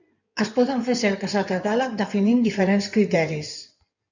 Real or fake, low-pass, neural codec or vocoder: fake; 7.2 kHz; codec, 16 kHz in and 24 kHz out, 2.2 kbps, FireRedTTS-2 codec